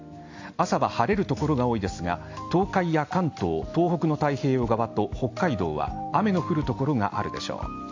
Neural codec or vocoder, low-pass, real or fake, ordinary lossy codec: none; 7.2 kHz; real; AAC, 48 kbps